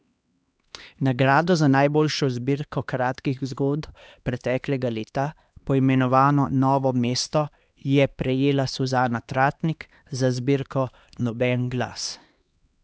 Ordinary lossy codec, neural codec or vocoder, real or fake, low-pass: none; codec, 16 kHz, 2 kbps, X-Codec, HuBERT features, trained on LibriSpeech; fake; none